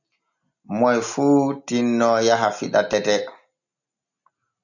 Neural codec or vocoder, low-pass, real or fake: none; 7.2 kHz; real